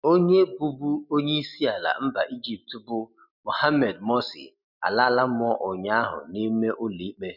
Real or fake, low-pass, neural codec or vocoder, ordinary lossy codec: real; 5.4 kHz; none; none